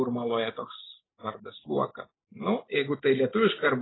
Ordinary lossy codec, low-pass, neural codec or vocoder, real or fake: AAC, 16 kbps; 7.2 kHz; none; real